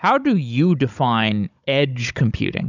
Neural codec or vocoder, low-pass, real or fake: codec, 16 kHz, 16 kbps, FunCodec, trained on Chinese and English, 50 frames a second; 7.2 kHz; fake